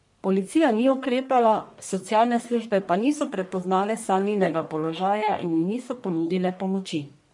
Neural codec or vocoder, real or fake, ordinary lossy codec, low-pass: codec, 44.1 kHz, 1.7 kbps, Pupu-Codec; fake; MP3, 64 kbps; 10.8 kHz